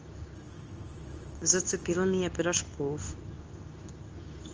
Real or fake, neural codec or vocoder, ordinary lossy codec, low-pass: fake; codec, 24 kHz, 0.9 kbps, WavTokenizer, medium speech release version 2; Opus, 24 kbps; 7.2 kHz